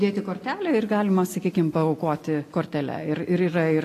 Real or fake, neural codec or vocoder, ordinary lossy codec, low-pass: real; none; AAC, 48 kbps; 14.4 kHz